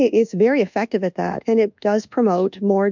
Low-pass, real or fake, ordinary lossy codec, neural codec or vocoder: 7.2 kHz; fake; MP3, 64 kbps; codec, 24 kHz, 1.2 kbps, DualCodec